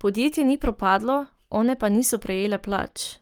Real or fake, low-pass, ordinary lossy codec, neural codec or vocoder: fake; 19.8 kHz; Opus, 32 kbps; codec, 44.1 kHz, 7.8 kbps, Pupu-Codec